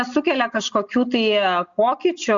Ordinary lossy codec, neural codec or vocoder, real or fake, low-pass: Opus, 64 kbps; none; real; 7.2 kHz